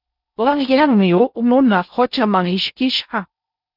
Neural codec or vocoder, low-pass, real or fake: codec, 16 kHz in and 24 kHz out, 0.6 kbps, FocalCodec, streaming, 4096 codes; 5.4 kHz; fake